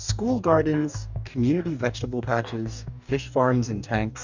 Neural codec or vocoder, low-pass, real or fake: codec, 44.1 kHz, 2.6 kbps, DAC; 7.2 kHz; fake